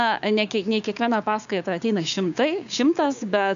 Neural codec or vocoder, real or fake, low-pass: codec, 16 kHz, 6 kbps, DAC; fake; 7.2 kHz